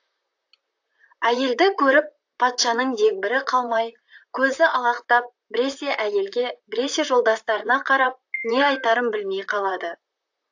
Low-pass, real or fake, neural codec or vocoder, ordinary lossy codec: 7.2 kHz; fake; vocoder, 44.1 kHz, 128 mel bands, Pupu-Vocoder; AAC, 48 kbps